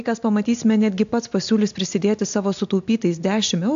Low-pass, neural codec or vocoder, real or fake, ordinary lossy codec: 7.2 kHz; none; real; AAC, 64 kbps